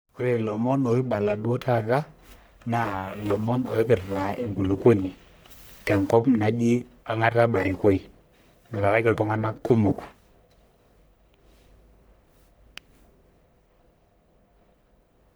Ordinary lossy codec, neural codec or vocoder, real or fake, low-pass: none; codec, 44.1 kHz, 1.7 kbps, Pupu-Codec; fake; none